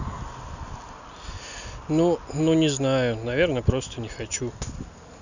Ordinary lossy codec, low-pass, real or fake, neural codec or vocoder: none; 7.2 kHz; real; none